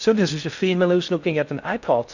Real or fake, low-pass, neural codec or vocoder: fake; 7.2 kHz; codec, 16 kHz in and 24 kHz out, 0.6 kbps, FocalCodec, streaming, 4096 codes